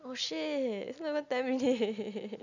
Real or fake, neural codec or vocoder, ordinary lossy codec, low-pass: real; none; none; 7.2 kHz